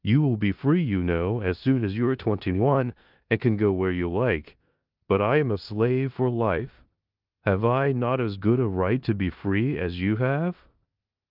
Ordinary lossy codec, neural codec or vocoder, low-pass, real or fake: Opus, 24 kbps; codec, 16 kHz in and 24 kHz out, 0.9 kbps, LongCat-Audio-Codec, four codebook decoder; 5.4 kHz; fake